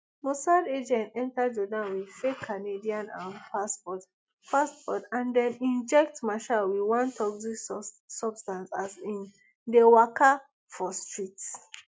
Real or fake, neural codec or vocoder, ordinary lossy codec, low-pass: real; none; none; none